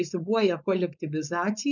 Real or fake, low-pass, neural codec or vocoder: fake; 7.2 kHz; codec, 16 kHz, 4.8 kbps, FACodec